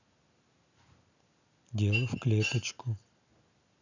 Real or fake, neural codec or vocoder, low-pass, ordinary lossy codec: real; none; 7.2 kHz; none